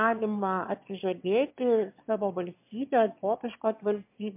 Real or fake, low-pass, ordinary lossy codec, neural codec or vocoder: fake; 3.6 kHz; MP3, 32 kbps; autoencoder, 22.05 kHz, a latent of 192 numbers a frame, VITS, trained on one speaker